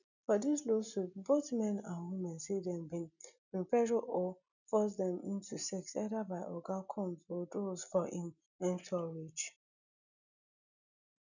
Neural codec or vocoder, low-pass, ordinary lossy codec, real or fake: none; 7.2 kHz; none; real